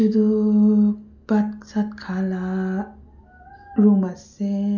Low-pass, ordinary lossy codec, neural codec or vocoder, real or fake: 7.2 kHz; none; none; real